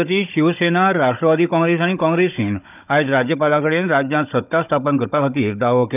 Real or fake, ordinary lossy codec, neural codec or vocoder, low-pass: fake; none; codec, 16 kHz, 16 kbps, FunCodec, trained on Chinese and English, 50 frames a second; 3.6 kHz